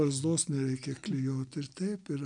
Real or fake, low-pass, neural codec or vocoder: real; 9.9 kHz; none